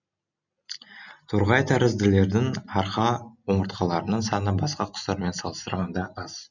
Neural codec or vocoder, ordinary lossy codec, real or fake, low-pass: none; none; real; 7.2 kHz